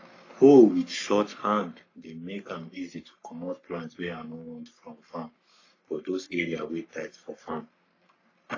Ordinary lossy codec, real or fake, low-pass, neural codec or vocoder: AAC, 32 kbps; fake; 7.2 kHz; codec, 44.1 kHz, 3.4 kbps, Pupu-Codec